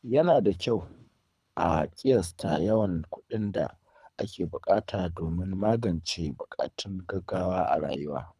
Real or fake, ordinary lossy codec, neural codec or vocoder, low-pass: fake; none; codec, 24 kHz, 3 kbps, HILCodec; none